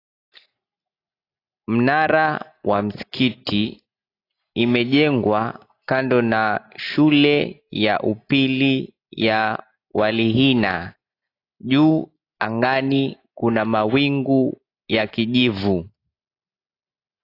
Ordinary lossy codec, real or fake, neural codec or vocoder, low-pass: AAC, 32 kbps; real; none; 5.4 kHz